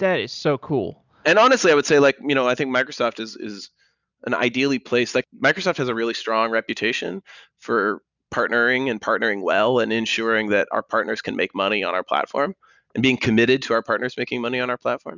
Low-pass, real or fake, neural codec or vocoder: 7.2 kHz; real; none